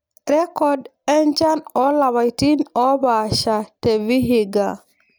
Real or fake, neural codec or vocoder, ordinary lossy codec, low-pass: real; none; none; none